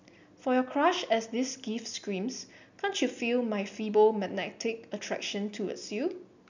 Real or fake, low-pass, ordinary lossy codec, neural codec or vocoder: real; 7.2 kHz; none; none